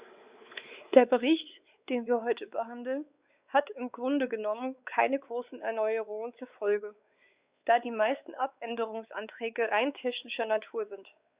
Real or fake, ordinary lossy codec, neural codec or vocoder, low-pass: fake; Opus, 64 kbps; codec, 16 kHz, 4 kbps, X-Codec, WavLM features, trained on Multilingual LibriSpeech; 3.6 kHz